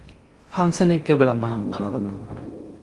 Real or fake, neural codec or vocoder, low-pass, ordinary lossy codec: fake; codec, 16 kHz in and 24 kHz out, 0.6 kbps, FocalCodec, streaming, 2048 codes; 10.8 kHz; Opus, 32 kbps